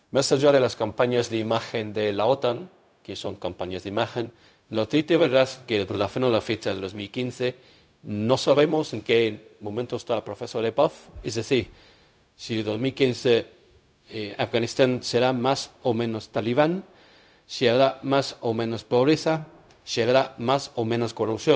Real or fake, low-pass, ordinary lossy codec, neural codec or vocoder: fake; none; none; codec, 16 kHz, 0.4 kbps, LongCat-Audio-Codec